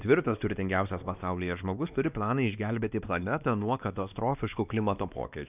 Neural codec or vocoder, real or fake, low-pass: codec, 16 kHz, 2 kbps, X-Codec, WavLM features, trained on Multilingual LibriSpeech; fake; 3.6 kHz